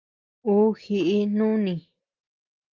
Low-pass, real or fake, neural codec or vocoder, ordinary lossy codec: 7.2 kHz; real; none; Opus, 24 kbps